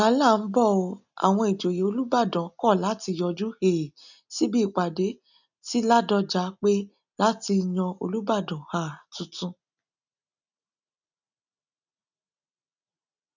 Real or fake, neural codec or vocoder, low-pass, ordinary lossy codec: real; none; 7.2 kHz; none